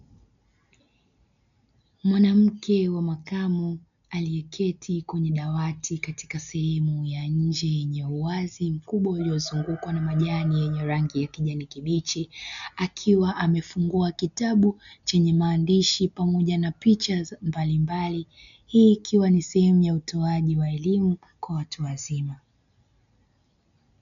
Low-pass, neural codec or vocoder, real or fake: 7.2 kHz; none; real